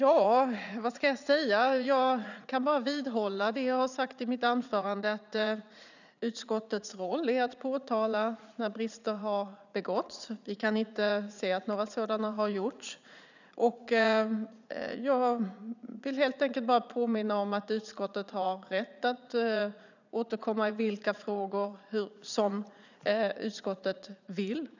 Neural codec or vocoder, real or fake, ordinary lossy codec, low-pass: vocoder, 44.1 kHz, 80 mel bands, Vocos; fake; none; 7.2 kHz